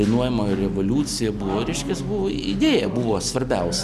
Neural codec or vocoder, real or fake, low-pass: none; real; 14.4 kHz